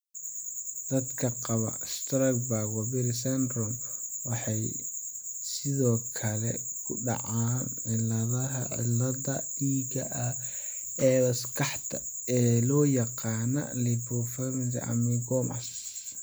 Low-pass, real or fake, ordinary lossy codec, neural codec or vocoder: none; real; none; none